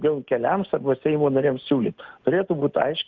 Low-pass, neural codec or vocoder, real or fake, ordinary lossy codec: 7.2 kHz; none; real; Opus, 24 kbps